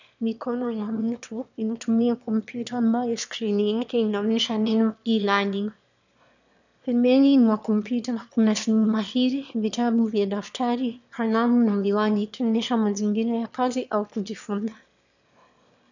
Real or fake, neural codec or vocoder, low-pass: fake; autoencoder, 22.05 kHz, a latent of 192 numbers a frame, VITS, trained on one speaker; 7.2 kHz